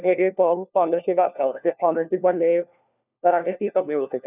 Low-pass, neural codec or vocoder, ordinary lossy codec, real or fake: 3.6 kHz; codec, 16 kHz, 1 kbps, FunCodec, trained on LibriTTS, 50 frames a second; none; fake